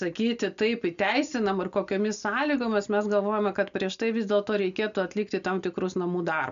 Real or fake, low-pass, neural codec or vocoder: real; 7.2 kHz; none